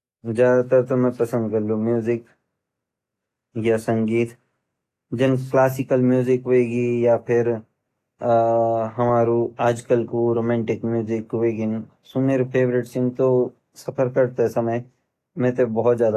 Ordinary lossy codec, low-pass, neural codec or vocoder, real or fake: AAC, 48 kbps; 14.4 kHz; none; real